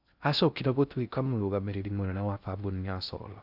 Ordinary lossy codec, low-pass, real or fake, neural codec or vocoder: none; 5.4 kHz; fake; codec, 16 kHz in and 24 kHz out, 0.6 kbps, FocalCodec, streaming, 2048 codes